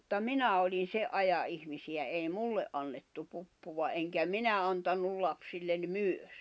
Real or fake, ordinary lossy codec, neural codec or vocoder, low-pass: real; none; none; none